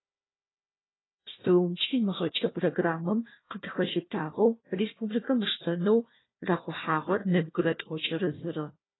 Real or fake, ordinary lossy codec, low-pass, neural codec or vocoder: fake; AAC, 16 kbps; 7.2 kHz; codec, 16 kHz, 1 kbps, FunCodec, trained on Chinese and English, 50 frames a second